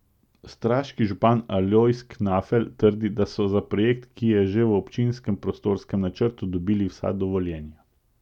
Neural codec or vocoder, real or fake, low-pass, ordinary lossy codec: none; real; 19.8 kHz; none